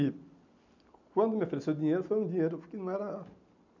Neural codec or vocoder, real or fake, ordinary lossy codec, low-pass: none; real; none; 7.2 kHz